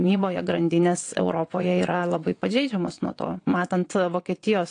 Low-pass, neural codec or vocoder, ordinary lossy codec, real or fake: 9.9 kHz; vocoder, 22.05 kHz, 80 mel bands, WaveNeXt; AAC, 48 kbps; fake